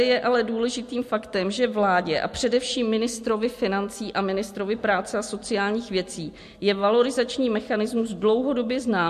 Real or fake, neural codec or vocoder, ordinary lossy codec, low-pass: real; none; MP3, 64 kbps; 14.4 kHz